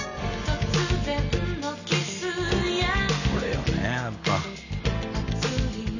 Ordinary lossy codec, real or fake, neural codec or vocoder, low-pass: none; real; none; 7.2 kHz